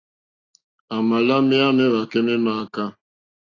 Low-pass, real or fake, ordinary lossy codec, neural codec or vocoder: 7.2 kHz; real; AAC, 32 kbps; none